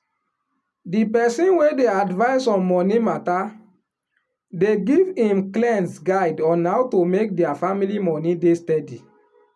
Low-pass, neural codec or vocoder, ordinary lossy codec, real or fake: none; none; none; real